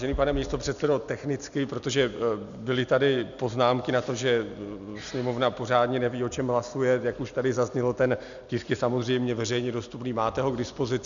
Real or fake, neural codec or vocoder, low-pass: real; none; 7.2 kHz